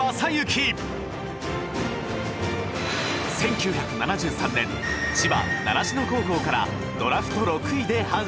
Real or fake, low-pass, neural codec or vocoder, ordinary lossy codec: real; none; none; none